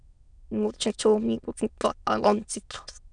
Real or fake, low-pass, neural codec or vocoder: fake; 9.9 kHz; autoencoder, 22.05 kHz, a latent of 192 numbers a frame, VITS, trained on many speakers